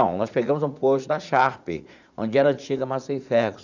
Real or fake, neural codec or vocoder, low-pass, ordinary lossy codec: fake; vocoder, 44.1 kHz, 80 mel bands, Vocos; 7.2 kHz; none